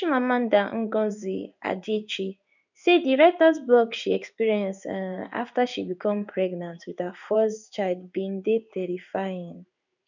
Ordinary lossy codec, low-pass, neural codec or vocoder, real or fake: none; 7.2 kHz; codec, 16 kHz in and 24 kHz out, 1 kbps, XY-Tokenizer; fake